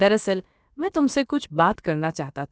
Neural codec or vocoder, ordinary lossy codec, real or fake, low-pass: codec, 16 kHz, about 1 kbps, DyCAST, with the encoder's durations; none; fake; none